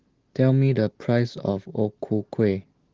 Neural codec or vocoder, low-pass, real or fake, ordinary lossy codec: none; 7.2 kHz; real; Opus, 16 kbps